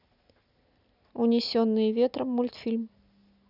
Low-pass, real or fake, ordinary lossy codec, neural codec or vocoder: 5.4 kHz; real; none; none